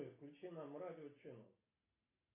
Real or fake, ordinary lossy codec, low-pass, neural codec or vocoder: real; AAC, 24 kbps; 3.6 kHz; none